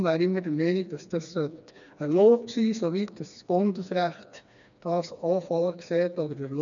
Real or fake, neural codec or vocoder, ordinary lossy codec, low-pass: fake; codec, 16 kHz, 2 kbps, FreqCodec, smaller model; none; 7.2 kHz